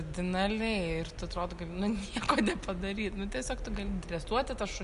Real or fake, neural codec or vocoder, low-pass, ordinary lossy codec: real; none; 10.8 kHz; MP3, 96 kbps